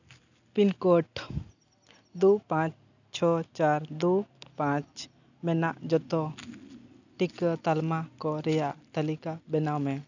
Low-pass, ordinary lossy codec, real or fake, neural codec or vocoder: 7.2 kHz; none; real; none